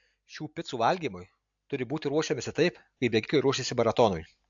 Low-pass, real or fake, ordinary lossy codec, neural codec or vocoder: 7.2 kHz; real; AAC, 64 kbps; none